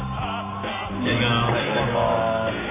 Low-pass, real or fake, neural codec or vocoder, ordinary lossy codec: 3.6 kHz; real; none; AAC, 16 kbps